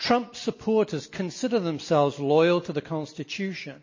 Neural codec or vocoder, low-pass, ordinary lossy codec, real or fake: none; 7.2 kHz; MP3, 32 kbps; real